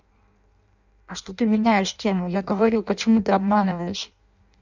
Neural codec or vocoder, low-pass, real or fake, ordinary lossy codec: codec, 16 kHz in and 24 kHz out, 0.6 kbps, FireRedTTS-2 codec; 7.2 kHz; fake; none